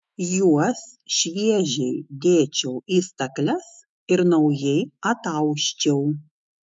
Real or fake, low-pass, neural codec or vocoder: fake; 10.8 kHz; autoencoder, 48 kHz, 128 numbers a frame, DAC-VAE, trained on Japanese speech